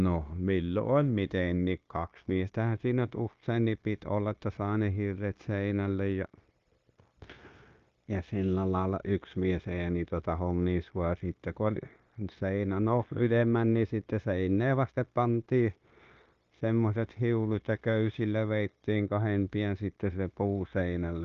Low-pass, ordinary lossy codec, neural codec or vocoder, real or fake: 7.2 kHz; Opus, 32 kbps; codec, 16 kHz, 0.9 kbps, LongCat-Audio-Codec; fake